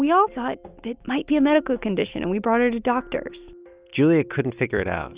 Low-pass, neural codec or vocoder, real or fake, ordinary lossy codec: 3.6 kHz; none; real; Opus, 64 kbps